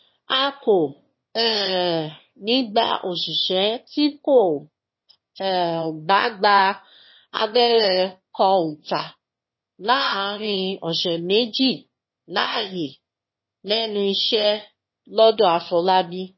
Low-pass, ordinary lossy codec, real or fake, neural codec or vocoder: 7.2 kHz; MP3, 24 kbps; fake; autoencoder, 22.05 kHz, a latent of 192 numbers a frame, VITS, trained on one speaker